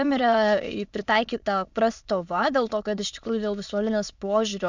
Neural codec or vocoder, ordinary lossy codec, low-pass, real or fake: autoencoder, 22.05 kHz, a latent of 192 numbers a frame, VITS, trained on many speakers; Opus, 64 kbps; 7.2 kHz; fake